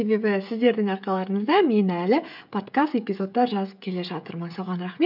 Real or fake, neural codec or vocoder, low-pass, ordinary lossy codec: fake; codec, 16 kHz, 16 kbps, FreqCodec, smaller model; 5.4 kHz; none